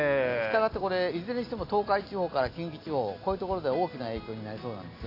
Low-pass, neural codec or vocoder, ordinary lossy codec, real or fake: 5.4 kHz; none; AAC, 32 kbps; real